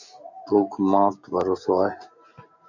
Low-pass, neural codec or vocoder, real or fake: 7.2 kHz; none; real